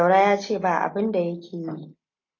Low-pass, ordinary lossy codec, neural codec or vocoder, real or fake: 7.2 kHz; AAC, 32 kbps; none; real